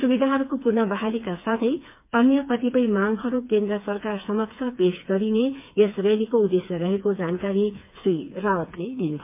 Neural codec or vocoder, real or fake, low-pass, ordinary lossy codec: codec, 16 kHz, 4 kbps, FreqCodec, smaller model; fake; 3.6 kHz; MP3, 32 kbps